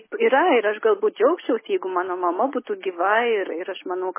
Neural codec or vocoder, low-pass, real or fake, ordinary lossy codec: none; 3.6 kHz; real; MP3, 16 kbps